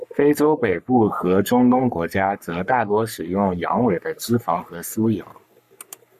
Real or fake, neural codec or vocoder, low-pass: fake; codec, 44.1 kHz, 3.4 kbps, Pupu-Codec; 14.4 kHz